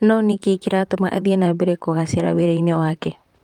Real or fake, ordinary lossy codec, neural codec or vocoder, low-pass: fake; Opus, 32 kbps; vocoder, 44.1 kHz, 128 mel bands, Pupu-Vocoder; 19.8 kHz